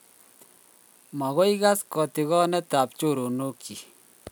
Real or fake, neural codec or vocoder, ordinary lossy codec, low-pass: real; none; none; none